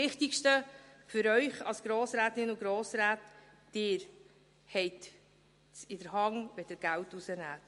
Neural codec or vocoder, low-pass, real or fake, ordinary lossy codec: none; 14.4 kHz; real; MP3, 48 kbps